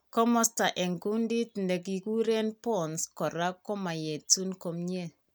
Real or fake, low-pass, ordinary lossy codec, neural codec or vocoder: real; none; none; none